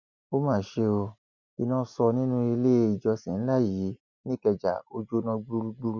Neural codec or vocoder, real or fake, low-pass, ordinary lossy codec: none; real; 7.2 kHz; none